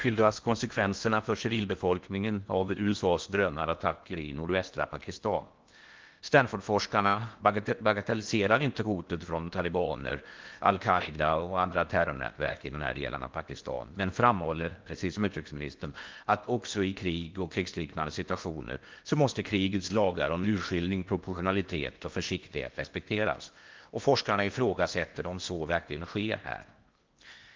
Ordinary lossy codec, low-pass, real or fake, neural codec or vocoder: Opus, 32 kbps; 7.2 kHz; fake; codec, 16 kHz in and 24 kHz out, 0.8 kbps, FocalCodec, streaming, 65536 codes